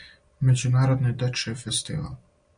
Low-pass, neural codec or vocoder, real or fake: 9.9 kHz; none; real